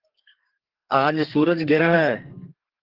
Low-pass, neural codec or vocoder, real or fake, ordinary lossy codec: 5.4 kHz; codec, 32 kHz, 1.9 kbps, SNAC; fake; Opus, 16 kbps